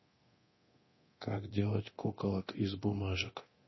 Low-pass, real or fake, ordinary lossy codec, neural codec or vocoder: 7.2 kHz; fake; MP3, 24 kbps; codec, 24 kHz, 0.9 kbps, DualCodec